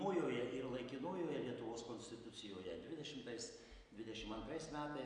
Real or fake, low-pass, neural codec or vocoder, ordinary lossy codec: real; 9.9 kHz; none; AAC, 64 kbps